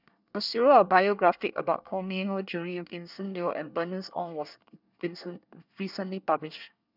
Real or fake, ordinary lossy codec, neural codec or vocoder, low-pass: fake; none; codec, 24 kHz, 1 kbps, SNAC; 5.4 kHz